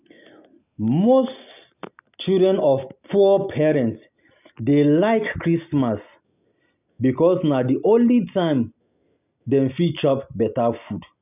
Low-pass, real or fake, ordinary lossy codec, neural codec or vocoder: 3.6 kHz; real; none; none